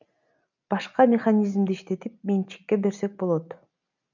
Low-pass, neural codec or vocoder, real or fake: 7.2 kHz; none; real